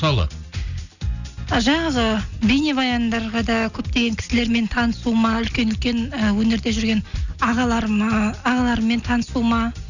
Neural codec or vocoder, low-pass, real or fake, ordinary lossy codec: none; 7.2 kHz; real; none